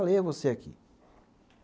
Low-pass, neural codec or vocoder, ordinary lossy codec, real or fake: none; none; none; real